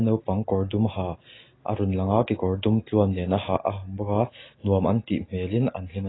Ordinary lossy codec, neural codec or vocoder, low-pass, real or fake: AAC, 16 kbps; none; 7.2 kHz; real